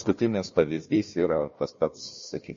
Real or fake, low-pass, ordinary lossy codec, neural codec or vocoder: fake; 7.2 kHz; MP3, 32 kbps; codec, 16 kHz, 1 kbps, FunCodec, trained on Chinese and English, 50 frames a second